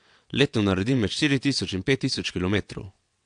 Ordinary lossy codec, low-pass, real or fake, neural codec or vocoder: AAC, 64 kbps; 9.9 kHz; fake; vocoder, 22.05 kHz, 80 mel bands, WaveNeXt